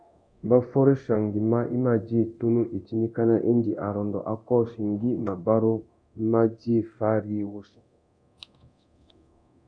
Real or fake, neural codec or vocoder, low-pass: fake; codec, 24 kHz, 0.9 kbps, DualCodec; 9.9 kHz